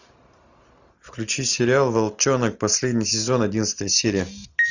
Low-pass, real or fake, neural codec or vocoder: 7.2 kHz; real; none